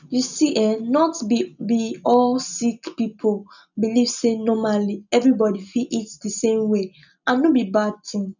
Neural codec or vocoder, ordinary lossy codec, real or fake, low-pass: none; none; real; 7.2 kHz